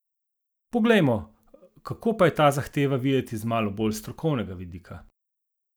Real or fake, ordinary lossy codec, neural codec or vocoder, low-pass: real; none; none; none